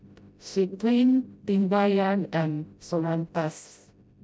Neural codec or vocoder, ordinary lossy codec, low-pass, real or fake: codec, 16 kHz, 0.5 kbps, FreqCodec, smaller model; none; none; fake